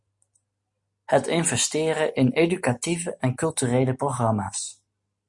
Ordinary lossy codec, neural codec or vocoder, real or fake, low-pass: MP3, 48 kbps; none; real; 10.8 kHz